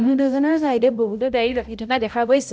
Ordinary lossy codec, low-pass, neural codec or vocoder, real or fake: none; none; codec, 16 kHz, 0.5 kbps, X-Codec, HuBERT features, trained on balanced general audio; fake